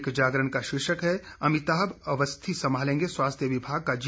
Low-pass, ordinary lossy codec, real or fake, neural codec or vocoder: none; none; real; none